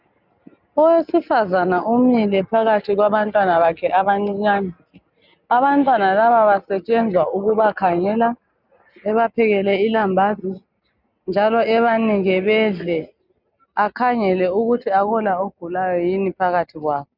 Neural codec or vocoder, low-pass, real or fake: none; 5.4 kHz; real